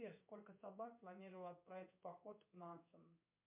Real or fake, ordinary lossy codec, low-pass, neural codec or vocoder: fake; MP3, 32 kbps; 3.6 kHz; codec, 16 kHz in and 24 kHz out, 1 kbps, XY-Tokenizer